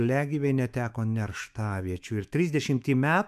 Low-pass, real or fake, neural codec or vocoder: 14.4 kHz; fake; autoencoder, 48 kHz, 128 numbers a frame, DAC-VAE, trained on Japanese speech